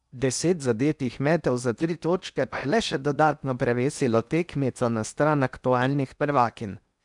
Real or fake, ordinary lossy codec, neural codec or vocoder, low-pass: fake; none; codec, 16 kHz in and 24 kHz out, 0.8 kbps, FocalCodec, streaming, 65536 codes; 10.8 kHz